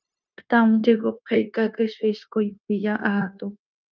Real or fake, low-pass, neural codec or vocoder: fake; 7.2 kHz; codec, 16 kHz, 0.9 kbps, LongCat-Audio-Codec